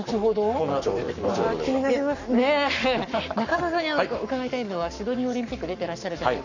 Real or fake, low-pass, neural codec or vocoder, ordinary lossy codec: fake; 7.2 kHz; codec, 44.1 kHz, 7.8 kbps, Pupu-Codec; none